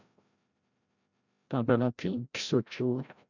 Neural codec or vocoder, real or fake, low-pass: codec, 16 kHz, 0.5 kbps, FreqCodec, larger model; fake; 7.2 kHz